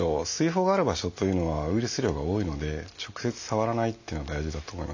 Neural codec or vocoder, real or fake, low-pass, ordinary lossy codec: none; real; 7.2 kHz; none